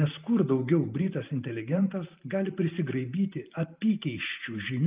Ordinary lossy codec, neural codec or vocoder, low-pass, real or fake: Opus, 24 kbps; vocoder, 44.1 kHz, 128 mel bands every 512 samples, BigVGAN v2; 3.6 kHz; fake